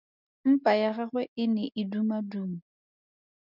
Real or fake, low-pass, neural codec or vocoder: real; 5.4 kHz; none